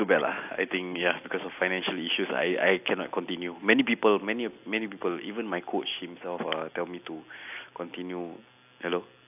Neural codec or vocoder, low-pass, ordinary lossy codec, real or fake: none; 3.6 kHz; AAC, 32 kbps; real